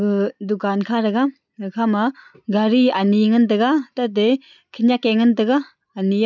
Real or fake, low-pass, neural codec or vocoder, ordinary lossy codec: real; 7.2 kHz; none; none